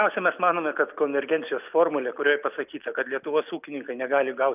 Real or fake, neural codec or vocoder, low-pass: real; none; 3.6 kHz